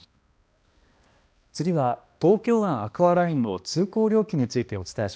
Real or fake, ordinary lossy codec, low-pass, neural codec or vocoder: fake; none; none; codec, 16 kHz, 1 kbps, X-Codec, HuBERT features, trained on balanced general audio